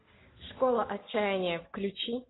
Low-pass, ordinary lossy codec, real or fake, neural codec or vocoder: 7.2 kHz; AAC, 16 kbps; real; none